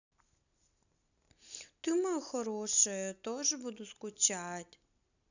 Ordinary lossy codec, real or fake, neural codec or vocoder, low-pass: none; real; none; 7.2 kHz